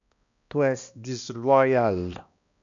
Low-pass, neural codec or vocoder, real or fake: 7.2 kHz; codec, 16 kHz, 1 kbps, X-Codec, HuBERT features, trained on balanced general audio; fake